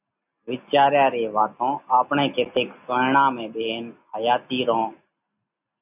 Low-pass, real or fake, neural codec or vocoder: 3.6 kHz; real; none